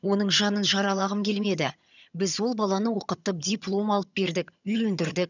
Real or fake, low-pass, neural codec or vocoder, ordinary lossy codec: fake; 7.2 kHz; vocoder, 22.05 kHz, 80 mel bands, HiFi-GAN; none